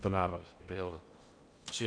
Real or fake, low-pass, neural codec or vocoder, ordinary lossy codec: fake; 9.9 kHz; codec, 16 kHz in and 24 kHz out, 0.8 kbps, FocalCodec, streaming, 65536 codes; MP3, 64 kbps